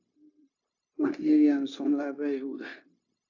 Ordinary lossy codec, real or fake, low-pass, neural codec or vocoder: AAC, 48 kbps; fake; 7.2 kHz; codec, 16 kHz, 0.9 kbps, LongCat-Audio-Codec